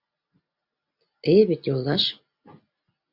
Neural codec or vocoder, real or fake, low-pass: none; real; 5.4 kHz